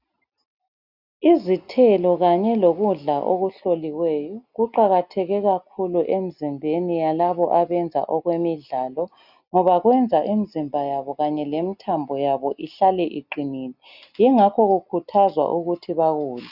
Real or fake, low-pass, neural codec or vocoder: real; 5.4 kHz; none